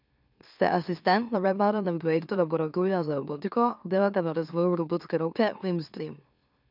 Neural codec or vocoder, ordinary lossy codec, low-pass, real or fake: autoencoder, 44.1 kHz, a latent of 192 numbers a frame, MeloTTS; none; 5.4 kHz; fake